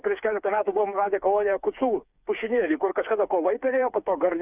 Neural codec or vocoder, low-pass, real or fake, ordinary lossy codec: codec, 16 kHz, 4 kbps, FreqCodec, smaller model; 3.6 kHz; fake; Opus, 64 kbps